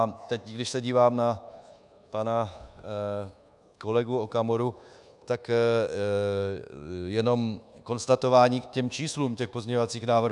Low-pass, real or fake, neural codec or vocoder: 10.8 kHz; fake; codec, 24 kHz, 1.2 kbps, DualCodec